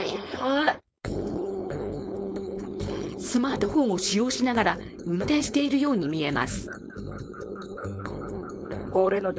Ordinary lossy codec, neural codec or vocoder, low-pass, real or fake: none; codec, 16 kHz, 4.8 kbps, FACodec; none; fake